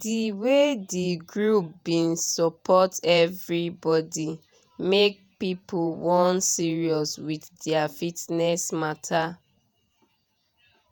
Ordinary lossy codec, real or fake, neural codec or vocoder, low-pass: none; fake; vocoder, 48 kHz, 128 mel bands, Vocos; none